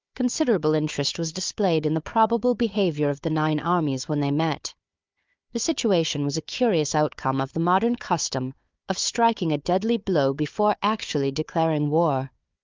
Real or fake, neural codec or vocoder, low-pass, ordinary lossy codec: fake; codec, 16 kHz, 4 kbps, FunCodec, trained on Chinese and English, 50 frames a second; 7.2 kHz; Opus, 24 kbps